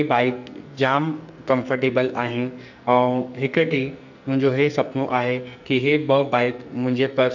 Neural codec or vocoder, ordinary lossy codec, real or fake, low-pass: codec, 44.1 kHz, 2.6 kbps, SNAC; none; fake; 7.2 kHz